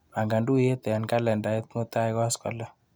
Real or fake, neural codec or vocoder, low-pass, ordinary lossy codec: real; none; none; none